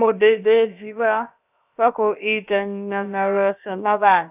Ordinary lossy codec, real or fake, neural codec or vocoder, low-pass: none; fake; codec, 16 kHz, about 1 kbps, DyCAST, with the encoder's durations; 3.6 kHz